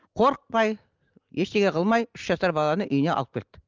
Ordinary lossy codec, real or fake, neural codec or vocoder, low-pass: Opus, 24 kbps; real; none; 7.2 kHz